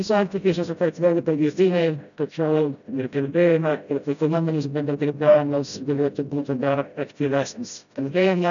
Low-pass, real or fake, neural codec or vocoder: 7.2 kHz; fake; codec, 16 kHz, 0.5 kbps, FreqCodec, smaller model